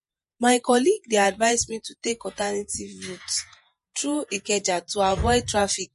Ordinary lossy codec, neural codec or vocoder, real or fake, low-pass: MP3, 48 kbps; vocoder, 48 kHz, 128 mel bands, Vocos; fake; 14.4 kHz